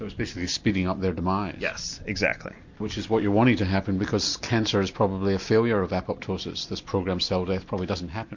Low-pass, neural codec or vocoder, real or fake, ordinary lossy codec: 7.2 kHz; none; real; MP3, 48 kbps